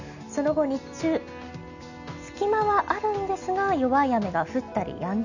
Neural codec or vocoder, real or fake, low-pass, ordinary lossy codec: none; real; 7.2 kHz; none